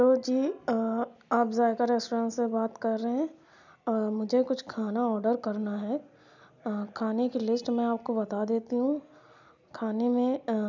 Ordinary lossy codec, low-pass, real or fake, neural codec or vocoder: none; 7.2 kHz; real; none